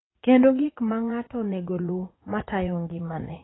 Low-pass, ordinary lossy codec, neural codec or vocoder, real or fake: 7.2 kHz; AAC, 16 kbps; vocoder, 44.1 kHz, 80 mel bands, Vocos; fake